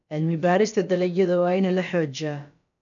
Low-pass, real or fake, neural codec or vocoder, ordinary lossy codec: 7.2 kHz; fake; codec, 16 kHz, about 1 kbps, DyCAST, with the encoder's durations; none